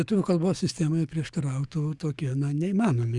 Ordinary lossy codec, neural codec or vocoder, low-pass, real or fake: Opus, 64 kbps; codec, 44.1 kHz, 7.8 kbps, DAC; 10.8 kHz; fake